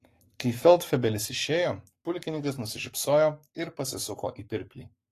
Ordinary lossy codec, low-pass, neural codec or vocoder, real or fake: AAC, 48 kbps; 14.4 kHz; codec, 44.1 kHz, 7.8 kbps, Pupu-Codec; fake